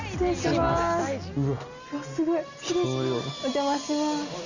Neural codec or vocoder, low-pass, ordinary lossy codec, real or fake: none; 7.2 kHz; none; real